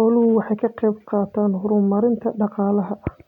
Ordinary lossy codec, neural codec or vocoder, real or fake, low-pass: none; none; real; 19.8 kHz